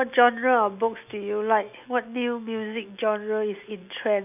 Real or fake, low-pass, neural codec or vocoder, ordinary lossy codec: real; 3.6 kHz; none; none